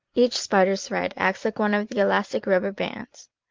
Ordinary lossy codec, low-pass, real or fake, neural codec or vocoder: Opus, 32 kbps; 7.2 kHz; fake; vocoder, 44.1 kHz, 128 mel bands every 512 samples, BigVGAN v2